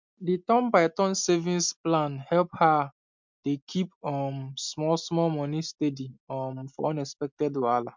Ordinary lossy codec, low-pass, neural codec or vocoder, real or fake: MP3, 64 kbps; 7.2 kHz; none; real